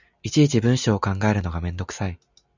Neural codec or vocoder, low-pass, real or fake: none; 7.2 kHz; real